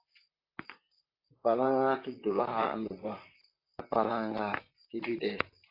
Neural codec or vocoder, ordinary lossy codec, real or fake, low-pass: vocoder, 44.1 kHz, 128 mel bands, Pupu-Vocoder; AAC, 32 kbps; fake; 5.4 kHz